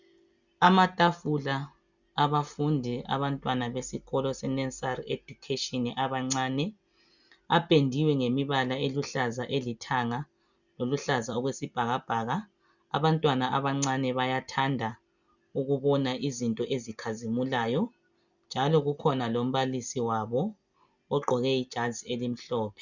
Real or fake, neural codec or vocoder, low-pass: real; none; 7.2 kHz